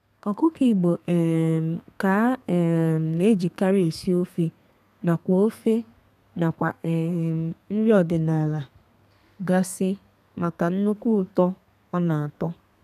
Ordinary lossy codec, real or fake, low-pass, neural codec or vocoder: none; fake; 14.4 kHz; codec, 32 kHz, 1.9 kbps, SNAC